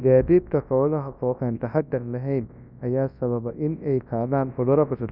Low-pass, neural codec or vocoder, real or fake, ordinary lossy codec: 5.4 kHz; codec, 24 kHz, 0.9 kbps, WavTokenizer, large speech release; fake; none